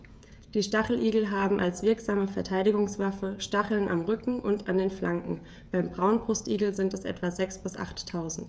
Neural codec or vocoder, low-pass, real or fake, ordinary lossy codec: codec, 16 kHz, 16 kbps, FreqCodec, smaller model; none; fake; none